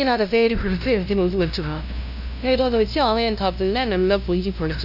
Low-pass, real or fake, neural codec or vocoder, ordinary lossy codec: 5.4 kHz; fake; codec, 16 kHz, 0.5 kbps, FunCodec, trained on LibriTTS, 25 frames a second; none